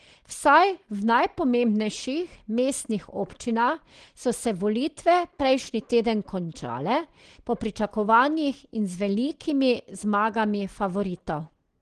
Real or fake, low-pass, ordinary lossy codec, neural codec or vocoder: real; 9.9 kHz; Opus, 16 kbps; none